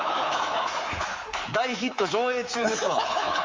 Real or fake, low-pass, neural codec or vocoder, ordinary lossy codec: fake; 7.2 kHz; codec, 24 kHz, 3.1 kbps, DualCodec; Opus, 32 kbps